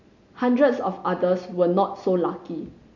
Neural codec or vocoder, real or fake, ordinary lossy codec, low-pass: none; real; none; 7.2 kHz